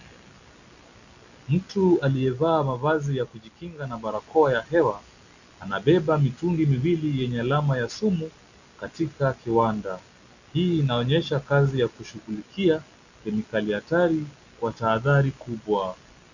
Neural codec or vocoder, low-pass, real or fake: none; 7.2 kHz; real